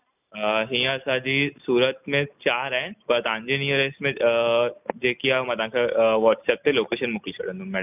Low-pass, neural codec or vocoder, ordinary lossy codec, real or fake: 3.6 kHz; none; none; real